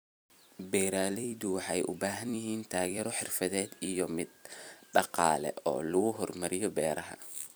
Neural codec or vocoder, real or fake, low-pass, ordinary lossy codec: vocoder, 44.1 kHz, 128 mel bands every 256 samples, BigVGAN v2; fake; none; none